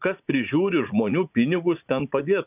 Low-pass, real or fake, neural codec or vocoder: 3.6 kHz; fake; vocoder, 44.1 kHz, 80 mel bands, Vocos